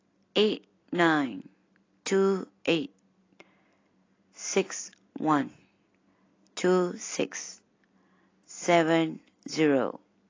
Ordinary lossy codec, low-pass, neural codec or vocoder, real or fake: AAC, 32 kbps; 7.2 kHz; none; real